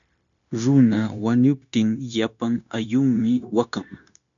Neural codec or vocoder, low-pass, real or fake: codec, 16 kHz, 0.9 kbps, LongCat-Audio-Codec; 7.2 kHz; fake